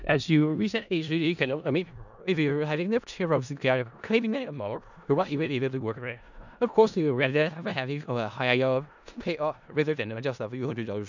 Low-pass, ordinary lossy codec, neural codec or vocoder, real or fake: 7.2 kHz; none; codec, 16 kHz in and 24 kHz out, 0.4 kbps, LongCat-Audio-Codec, four codebook decoder; fake